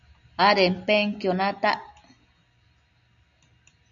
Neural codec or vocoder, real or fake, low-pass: none; real; 7.2 kHz